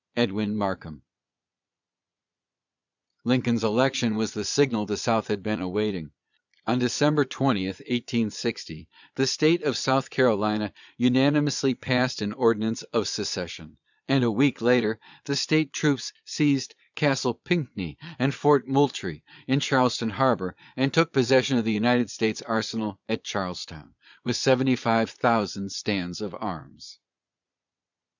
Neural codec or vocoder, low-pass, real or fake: vocoder, 22.05 kHz, 80 mel bands, Vocos; 7.2 kHz; fake